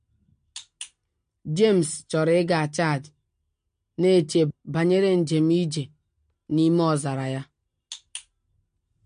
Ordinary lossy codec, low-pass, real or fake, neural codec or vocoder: MP3, 48 kbps; 9.9 kHz; real; none